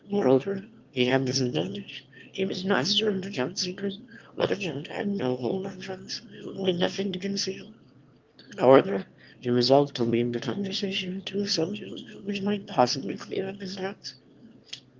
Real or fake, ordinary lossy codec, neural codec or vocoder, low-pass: fake; Opus, 32 kbps; autoencoder, 22.05 kHz, a latent of 192 numbers a frame, VITS, trained on one speaker; 7.2 kHz